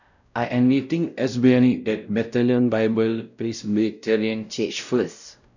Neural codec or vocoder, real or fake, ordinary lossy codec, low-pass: codec, 16 kHz, 0.5 kbps, X-Codec, WavLM features, trained on Multilingual LibriSpeech; fake; none; 7.2 kHz